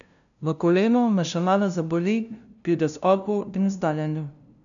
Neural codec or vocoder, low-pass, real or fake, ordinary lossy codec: codec, 16 kHz, 0.5 kbps, FunCodec, trained on LibriTTS, 25 frames a second; 7.2 kHz; fake; none